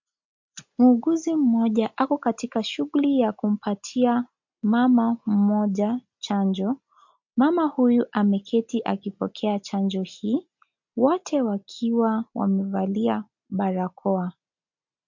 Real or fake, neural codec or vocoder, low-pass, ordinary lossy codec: real; none; 7.2 kHz; MP3, 48 kbps